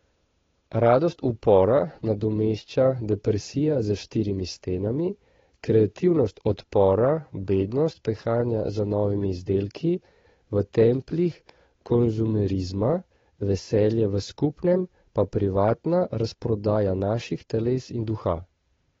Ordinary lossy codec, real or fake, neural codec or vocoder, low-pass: AAC, 24 kbps; fake; codec, 16 kHz, 8 kbps, FunCodec, trained on Chinese and English, 25 frames a second; 7.2 kHz